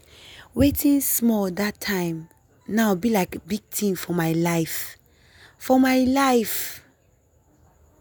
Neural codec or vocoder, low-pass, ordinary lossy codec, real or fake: none; none; none; real